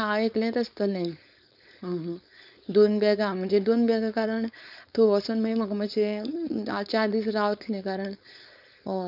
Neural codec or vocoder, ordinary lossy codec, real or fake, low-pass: codec, 16 kHz, 4.8 kbps, FACodec; none; fake; 5.4 kHz